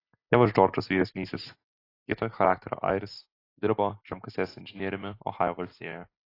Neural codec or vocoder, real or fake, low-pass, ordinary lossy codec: none; real; 5.4 kHz; AAC, 32 kbps